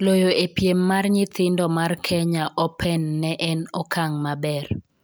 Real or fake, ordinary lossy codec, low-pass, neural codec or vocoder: real; none; none; none